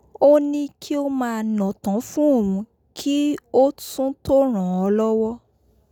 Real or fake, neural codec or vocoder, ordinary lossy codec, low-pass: real; none; none; 19.8 kHz